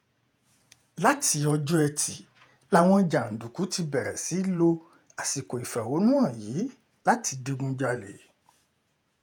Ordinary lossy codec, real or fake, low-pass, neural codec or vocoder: none; fake; none; vocoder, 48 kHz, 128 mel bands, Vocos